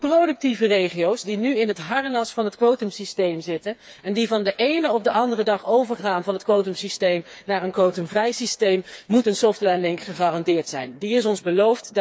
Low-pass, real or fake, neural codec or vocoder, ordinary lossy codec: none; fake; codec, 16 kHz, 4 kbps, FreqCodec, smaller model; none